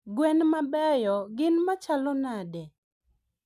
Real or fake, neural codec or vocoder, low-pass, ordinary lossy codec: real; none; 14.4 kHz; none